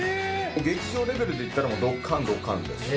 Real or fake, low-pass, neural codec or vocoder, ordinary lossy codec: real; none; none; none